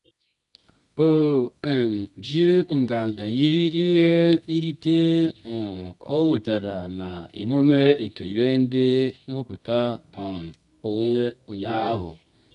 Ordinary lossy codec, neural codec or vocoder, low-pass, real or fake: none; codec, 24 kHz, 0.9 kbps, WavTokenizer, medium music audio release; 10.8 kHz; fake